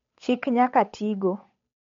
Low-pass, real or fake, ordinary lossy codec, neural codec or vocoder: 7.2 kHz; fake; MP3, 48 kbps; codec, 16 kHz, 8 kbps, FunCodec, trained on Chinese and English, 25 frames a second